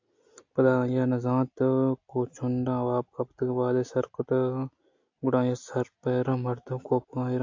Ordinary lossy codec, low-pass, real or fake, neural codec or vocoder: AAC, 48 kbps; 7.2 kHz; real; none